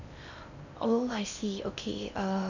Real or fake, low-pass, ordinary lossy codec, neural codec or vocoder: fake; 7.2 kHz; none; codec, 16 kHz in and 24 kHz out, 0.6 kbps, FocalCodec, streaming, 2048 codes